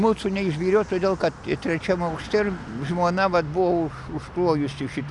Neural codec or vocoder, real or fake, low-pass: none; real; 10.8 kHz